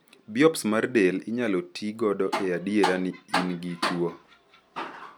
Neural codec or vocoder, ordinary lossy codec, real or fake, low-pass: none; none; real; none